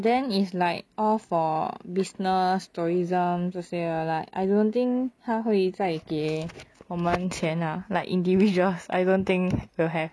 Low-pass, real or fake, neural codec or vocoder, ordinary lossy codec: none; real; none; none